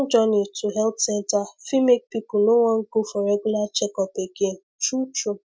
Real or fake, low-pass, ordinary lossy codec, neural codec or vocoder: real; none; none; none